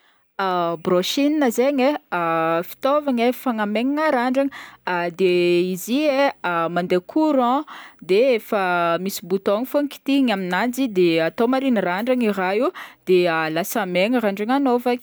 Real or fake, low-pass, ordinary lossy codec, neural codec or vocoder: real; none; none; none